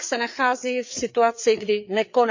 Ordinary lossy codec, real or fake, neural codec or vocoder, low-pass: MP3, 64 kbps; fake; codec, 16 kHz, 4 kbps, FreqCodec, larger model; 7.2 kHz